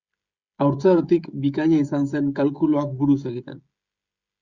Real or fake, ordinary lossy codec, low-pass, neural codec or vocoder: fake; Opus, 64 kbps; 7.2 kHz; codec, 16 kHz, 16 kbps, FreqCodec, smaller model